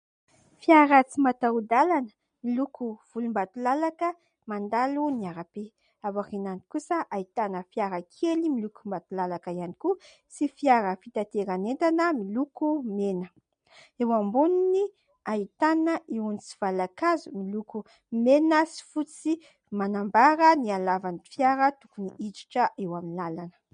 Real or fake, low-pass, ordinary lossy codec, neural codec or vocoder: real; 9.9 kHz; MP3, 48 kbps; none